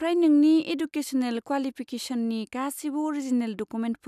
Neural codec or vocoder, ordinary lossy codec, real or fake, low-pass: none; none; real; 14.4 kHz